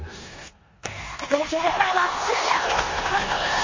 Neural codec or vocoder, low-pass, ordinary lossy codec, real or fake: codec, 16 kHz in and 24 kHz out, 0.9 kbps, LongCat-Audio-Codec, four codebook decoder; 7.2 kHz; MP3, 32 kbps; fake